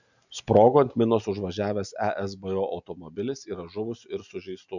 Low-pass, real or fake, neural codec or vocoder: 7.2 kHz; real; none